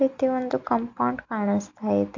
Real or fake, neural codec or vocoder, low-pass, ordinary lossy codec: real; none; 7.2 kHz; MP3, 64 kbps